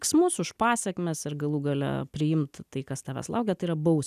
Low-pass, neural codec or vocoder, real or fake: 14.4 kHz; none; real